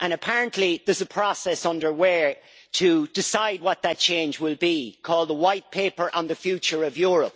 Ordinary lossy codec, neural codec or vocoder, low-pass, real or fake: none; none; none; real